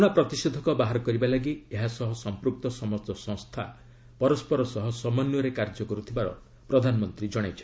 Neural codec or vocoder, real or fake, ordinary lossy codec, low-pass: none; real; none; none